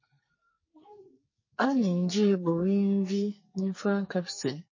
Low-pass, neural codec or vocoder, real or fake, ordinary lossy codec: 7.2 kHz; codec, 44.1 kHz, 2.6 kbps, SNAC; fake; MP3, 32 kbps